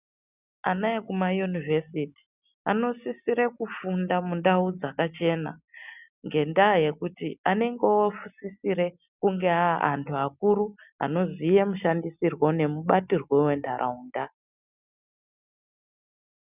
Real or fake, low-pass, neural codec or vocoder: real; 3.6 kHz; none